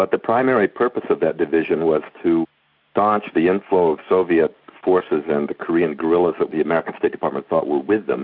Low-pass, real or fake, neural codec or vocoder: 5.4 kHz; real; none